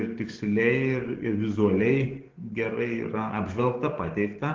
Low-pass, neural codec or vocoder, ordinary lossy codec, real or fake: 7.2 kHz; codec, 44.1 kHz, 7.8 kbps, DAC; Opus, 16 kbps; fake